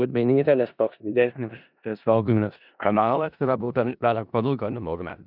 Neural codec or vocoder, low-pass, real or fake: codec, 16 kHz in and 24 kHz out, 0.4 kbps, LongCat-Audio-Codec, four codebook decoder; 5.4 kHz; fake